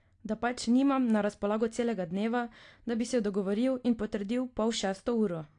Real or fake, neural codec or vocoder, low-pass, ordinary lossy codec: real; none; 9.9 kHz; AAC, 48 kbps